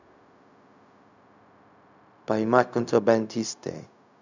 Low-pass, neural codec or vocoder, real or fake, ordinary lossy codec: 7.2 kHz; codec, 16 kHz, 0.4 kbps, LongCat-Audio-Codec; fake; none